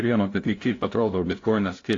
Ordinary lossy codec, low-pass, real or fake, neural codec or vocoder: AAC, 32 kbps; 7.2 kHz; fake; codec, 16 kHz, 0.5 kbps, FunCodec, trained on LibriTTS, 25 frames a second